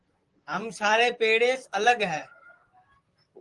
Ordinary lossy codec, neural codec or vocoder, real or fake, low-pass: Opus, 24 kbps; vocoder, 44.1 kHz, 128 mel bands, Pupu-Vocoder; fake; 10.8 kHz